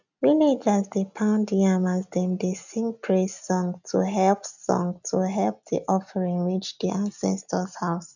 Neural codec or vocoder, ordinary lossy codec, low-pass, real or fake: none; none; 7.2 kHz; real